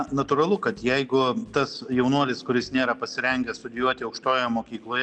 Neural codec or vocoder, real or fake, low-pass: none; real; 9.9 kHz